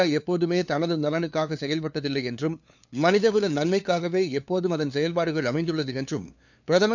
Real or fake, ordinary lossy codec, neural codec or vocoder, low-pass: fake; none; codec, 16 kHz, 2 kbps, FunCodec, trained on LibriTTS, 25 frames a second; 7.2 kHz